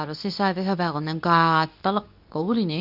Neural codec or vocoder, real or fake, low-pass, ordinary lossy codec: codec, 24 kHz, 0.9 kbps, WavTokenizer, medium speech release version 2; fake; 5.4 kHz; none